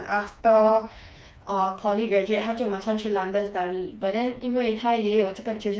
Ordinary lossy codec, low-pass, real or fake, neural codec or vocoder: none; none; fake; codec, 16 kHz, 2 kbps, FreqCodec, smaller model